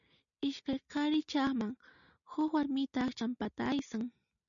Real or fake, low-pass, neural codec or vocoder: real; 7.2 kHz; none